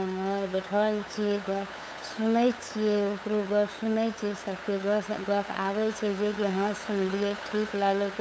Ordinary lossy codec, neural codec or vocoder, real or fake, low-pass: none; codec, 16 kHz, 8 kbps, FunCodec, trained on LibriTTS, 25 frames a second; fake; none